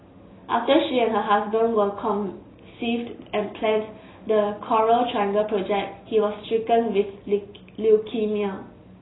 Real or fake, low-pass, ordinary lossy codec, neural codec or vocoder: real; 7.2 kHz; AAC, 16 kbps; none